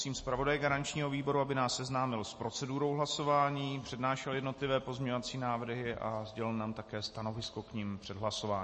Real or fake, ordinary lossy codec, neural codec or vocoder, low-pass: real; MP3, 32 kbps; none; 10.8 kHz